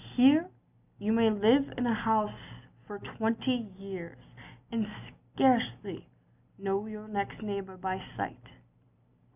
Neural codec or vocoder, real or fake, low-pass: none; real; 3.6 kHz